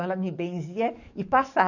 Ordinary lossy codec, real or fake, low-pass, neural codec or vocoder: none; fake; 7.2 kHz; codec, 44.1 kHz, 7.8 kbps, DAC